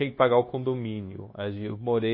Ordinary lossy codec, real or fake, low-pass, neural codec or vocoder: MP3, 24 kbps; fake; 5.4 kHz; codec, 16 kHz, 0.9 kbps, LongCat-Audio-Codec